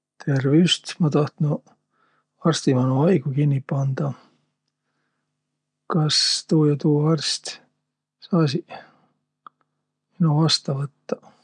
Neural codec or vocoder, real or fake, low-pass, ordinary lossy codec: none; real; 9.9 kHz; none